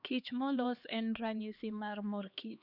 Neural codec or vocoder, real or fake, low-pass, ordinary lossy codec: codec, 16 kHz, 2 kbps, X-Codec, HuBERT features, trained on LibriSpeech; fake; 5.4 kHz; none